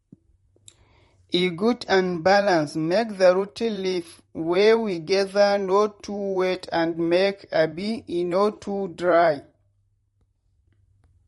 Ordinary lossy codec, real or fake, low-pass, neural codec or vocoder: MP3, 48 kbps; fake; 19.8 kHz; vocoder, 44.1 kHz, 128 mel bands, Pupu-Vocoder